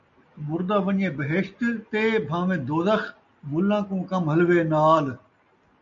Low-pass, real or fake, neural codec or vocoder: 7.2 kHz; real; none